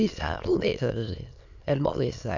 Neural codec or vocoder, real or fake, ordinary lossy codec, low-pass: autoencoder, 22.05 kHz, a latent of 192 numbers a frame, VITS, trained on many speakers; fake; none; 7.2 kHz